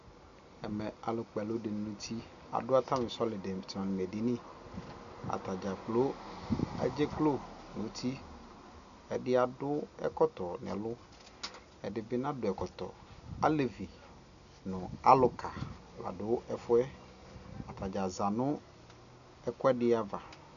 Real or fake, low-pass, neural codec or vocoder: real; 7.2 kHz; none